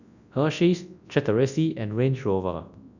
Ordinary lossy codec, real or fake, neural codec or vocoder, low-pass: none; fake; codec, 24 kHz, 0.9 kbps, WavTokenizer, large speech release; 7.2 kHz